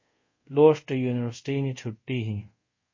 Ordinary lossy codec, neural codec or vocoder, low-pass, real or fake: MP3, 32 kbps; codec, 24 kHz, 0.5 kbps, DualCodec; 7.2 kHz; fake